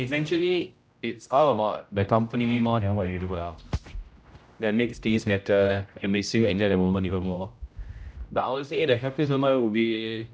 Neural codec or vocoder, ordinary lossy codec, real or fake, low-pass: codec, 16 kHz, 0.5 kbps, X-Codec, HuBERT features, trained on general audio; none; fake; none